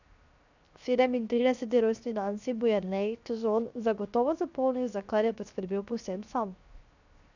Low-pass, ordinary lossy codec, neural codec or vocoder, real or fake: 7.2 kHz; none; codec, 16 kHz, 0.7 kbps, FocalCodec; fake